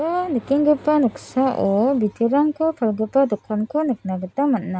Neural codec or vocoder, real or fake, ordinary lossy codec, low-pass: none; real; none; none